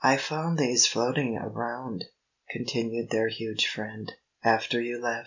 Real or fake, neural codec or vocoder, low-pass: real; none; 7.2 kHz